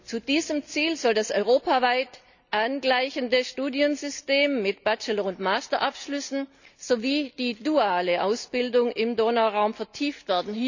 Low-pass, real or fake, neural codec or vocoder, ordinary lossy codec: 7.2 kHz; real; none; none